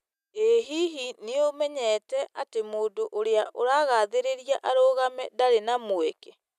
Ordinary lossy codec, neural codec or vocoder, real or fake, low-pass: none; none; real; 14.4 kHz